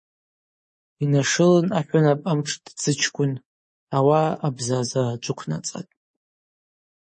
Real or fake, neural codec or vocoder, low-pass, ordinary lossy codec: fake; codec, 24 kHz, 3.1 kbps, DualCodec; 10.8 kHz; MP3, 32 kbps